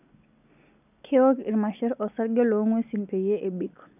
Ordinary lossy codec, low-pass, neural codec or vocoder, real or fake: none; 3.6 kHz; none; real